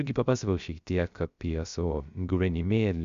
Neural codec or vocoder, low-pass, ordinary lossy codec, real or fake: codec, 16 kHz, 0.3 kbps, FocalCodec; 7.2 kHz; Opus, 64 kbps; fake